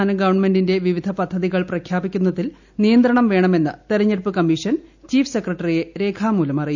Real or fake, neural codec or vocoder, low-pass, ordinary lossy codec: real; none; 7.2 kHz; none